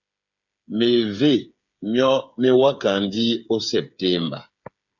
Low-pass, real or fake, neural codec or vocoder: 7.2 kHz; fake; codec, 16 kHz, 8 kbps, FreqCodec, smaller model